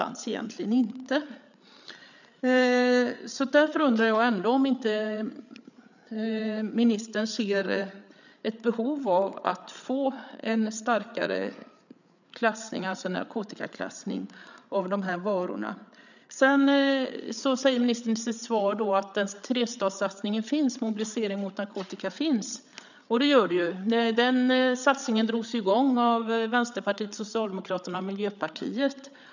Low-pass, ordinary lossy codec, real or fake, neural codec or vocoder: 7.2 kHz; none; fake; codec, 16 kHz, 8 kbps, FreqCodec, larger model